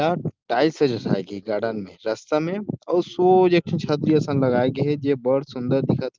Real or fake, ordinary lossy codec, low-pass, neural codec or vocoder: real; Opus, 32 kbps; 7.2 kHz; none